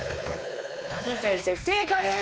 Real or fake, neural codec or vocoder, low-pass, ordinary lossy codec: fake; codec, 16 kHz, 2 kbps, X-Codec, WavLM features, trained on Multilingual LibriSpeech; none; none